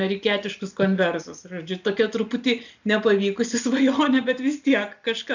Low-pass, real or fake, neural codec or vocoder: 7.2 kHz; real; none